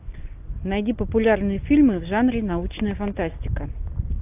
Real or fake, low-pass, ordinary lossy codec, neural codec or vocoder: real; 3.6 kHz; AAC, 32 kbps; none